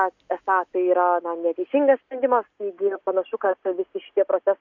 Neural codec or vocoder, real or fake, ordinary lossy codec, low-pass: none; real; Opus, 64 kbps; 7.2 kHz